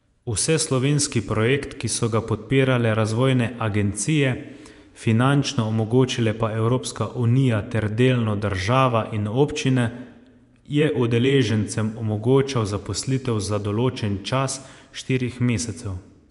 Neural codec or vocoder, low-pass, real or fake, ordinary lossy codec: vocoder, 24 kHz, 100 mel bands, Vocos; 10.8 kHz; fake; none